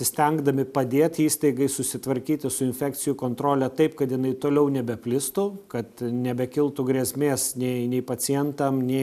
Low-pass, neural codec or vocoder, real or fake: 14.4 kHz; none; real